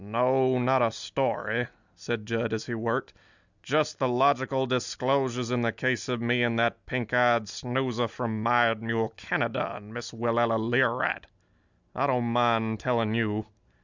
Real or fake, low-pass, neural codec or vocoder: real; 7.2 kHz; none